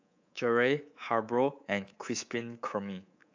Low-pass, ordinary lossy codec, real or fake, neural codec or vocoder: 7.2 kHz; AAC, 48 kbps; fake; codec, 24 kHz, 3.1 kbps, DualCodec